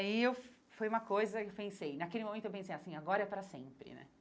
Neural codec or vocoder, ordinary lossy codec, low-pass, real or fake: none; none; none; real